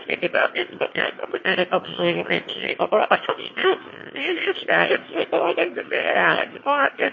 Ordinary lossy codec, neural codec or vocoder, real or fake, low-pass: MP3, 32 kbps; autoencoder, 22.05 kHz, a latent of 192 numbers a frame, VITS, trained on one speaker; fake; 7.2 kHz